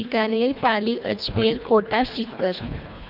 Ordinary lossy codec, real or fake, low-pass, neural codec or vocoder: none; fake; 5.4 kHz; codec, 24 kHz, 1.5 kbps, HILCodec